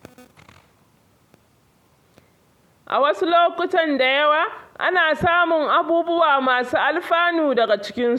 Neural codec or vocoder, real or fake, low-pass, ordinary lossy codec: none; real; 19.8 kHz; MP3, 96 kbps